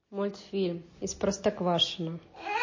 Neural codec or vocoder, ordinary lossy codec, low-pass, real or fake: none; MP3, 32 kbps; 7.2 kHz; real